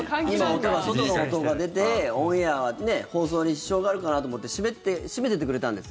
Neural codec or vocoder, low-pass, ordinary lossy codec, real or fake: none; none; none; real